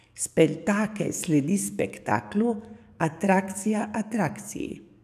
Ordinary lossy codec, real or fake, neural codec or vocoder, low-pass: none; fake; codec, 44.1 kHz, 7.8 kbps, DAC; 14.4 kHz